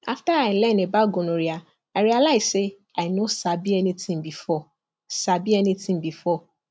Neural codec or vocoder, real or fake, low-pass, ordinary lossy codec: none; real; none; none